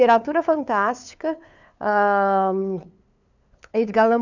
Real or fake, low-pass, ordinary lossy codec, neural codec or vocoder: fake; 7.2 kHz; none; codec, 16 kHz, 2 kbps, FunCodec, trained on LibriTTS, 25 frames a second